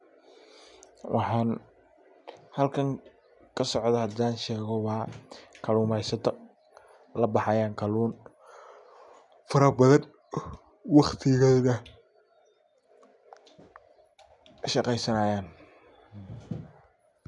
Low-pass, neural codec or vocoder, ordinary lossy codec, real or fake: 10.8 kHz; none; none; real